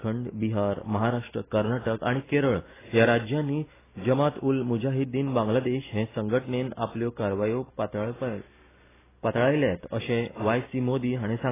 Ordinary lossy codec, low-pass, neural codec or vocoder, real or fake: AAC, 16 kbps; 3.6 kHz; none; real